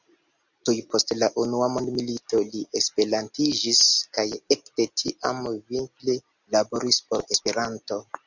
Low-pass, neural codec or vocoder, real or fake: 7.2 kHz; none; real